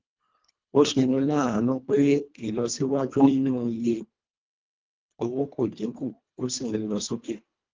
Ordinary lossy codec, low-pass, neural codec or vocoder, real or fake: Opus, 32 kbps; 7.2 kHz; codec, 24 kHz, 1.5 kbps, HILCodec; fake